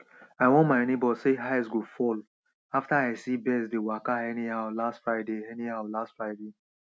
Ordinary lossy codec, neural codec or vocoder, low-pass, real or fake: none; none; none; real